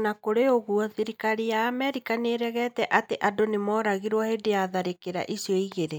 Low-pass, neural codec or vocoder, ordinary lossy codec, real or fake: none; none; none; real